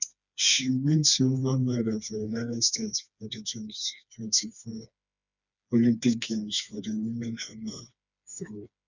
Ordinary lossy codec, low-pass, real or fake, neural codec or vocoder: none; 7.2 kHz; fake; codec, 16 kHz, 2 kbps, FreqCodec, smaller model